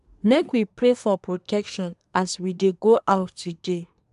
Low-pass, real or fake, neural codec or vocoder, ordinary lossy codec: 10.8 kHz; fake; codec, 24 kHz, 1 kbps, SNAC; none